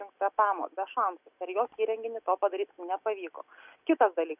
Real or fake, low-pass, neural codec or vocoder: real; 3.6 kHz; none